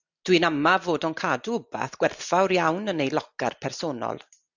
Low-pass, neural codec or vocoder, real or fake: 7.2 kHz; none; real